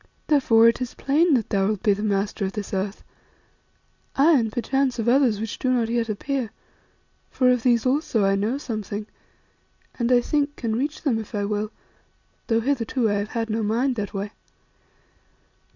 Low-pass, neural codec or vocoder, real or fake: 7.2 kHz; none; real